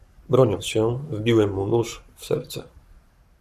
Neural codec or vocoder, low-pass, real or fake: codec, 44.1 kHz, 7.8 kbps, Pupu-Codec; 14.4 kHz; fake